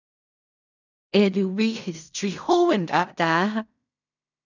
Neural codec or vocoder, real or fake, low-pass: codec, 16 kHz in and 24 kHz out, 0.4 kbps, LongCat-Audio-Codec, fine tuned four codebook decoder; fake; 7.2 kHz